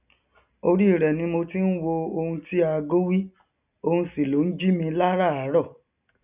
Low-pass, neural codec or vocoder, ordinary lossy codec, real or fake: 3.6 kHz; none; none; real